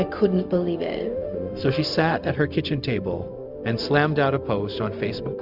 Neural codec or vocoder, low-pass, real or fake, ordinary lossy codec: codec, 16 kHz, 0.4 kbps, LongCat-Audio-Codec; 5.4 kHz; fake; Opus, 64 kbps